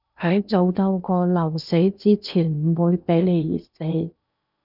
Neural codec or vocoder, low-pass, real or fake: codec, 16 kHz in and 24 kHz out, 0.8 kbps, FocalCodec, streaming, 65536 codes; 5.4 kHz; fake